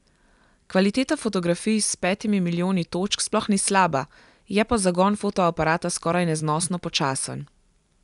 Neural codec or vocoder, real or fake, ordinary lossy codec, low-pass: none; real; none; 10.8 kHz